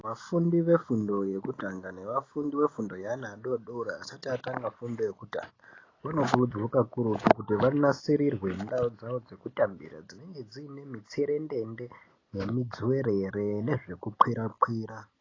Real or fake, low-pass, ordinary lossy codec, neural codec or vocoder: real; 7.2 kHz; AAC, 32 kbps; none